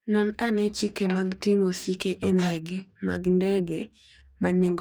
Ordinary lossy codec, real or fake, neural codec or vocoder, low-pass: none; fake; codec, 44.1 kHz, 2.6 kbps, DAC; none